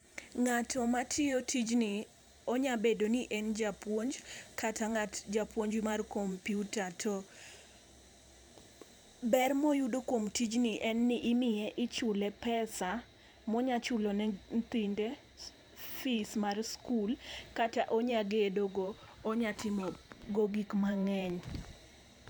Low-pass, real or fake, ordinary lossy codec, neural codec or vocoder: none; fake; none; vocoder, 44.1 kHz, 128 mel bands every 512 samples, BigVGAN v2